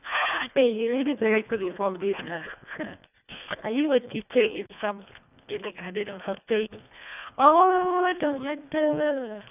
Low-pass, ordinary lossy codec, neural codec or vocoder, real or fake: 3.6 kHz; none; codec, 24 kHz, 1.5 kbps, HILCodec; fake